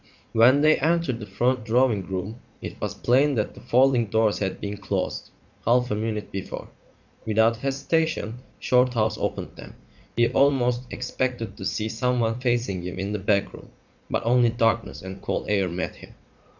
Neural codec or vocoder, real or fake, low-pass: vocoder, 44.1 kHz, 80 mel bands, Vocos; fake; 7.2 kHz